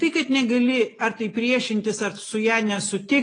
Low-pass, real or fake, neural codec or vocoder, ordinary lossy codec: 9.9 kHz; real; none; AAC, 32 kbps